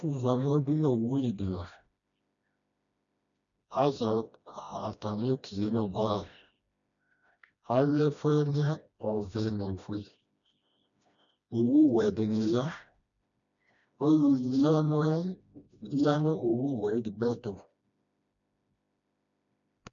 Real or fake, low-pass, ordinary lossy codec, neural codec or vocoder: fake; 7.2 kHz; MP3, 96 kbps; codec, 16 kHz, 1 kbps, FreqCodec, smaller model